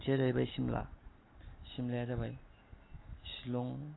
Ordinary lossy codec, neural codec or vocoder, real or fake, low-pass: AAC, 16 kbps; none; real; 7.2 kHz